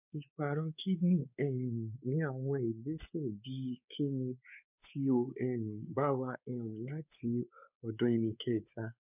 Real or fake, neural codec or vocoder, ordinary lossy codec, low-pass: fake; codec, 16 kHz, 4 kbps, X-Codec, WavLM features, trained on Multilingual LibriSpeech; none; 3.6 kHz